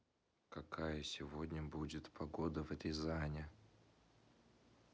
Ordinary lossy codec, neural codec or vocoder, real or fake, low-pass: none; none; real; none